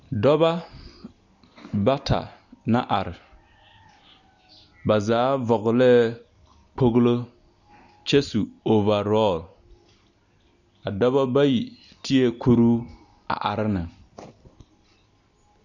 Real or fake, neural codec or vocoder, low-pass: real; none; 7.2 kHz